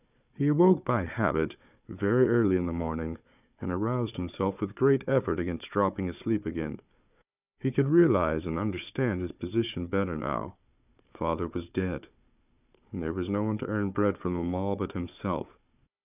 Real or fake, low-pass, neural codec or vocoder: fake; 3.6 kHz; codec, 16 kHz, 4 kbps, FunCodec, trained on Chinese and English, 50 frames a second